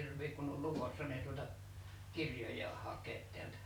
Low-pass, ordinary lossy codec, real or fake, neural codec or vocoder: none; none; real; none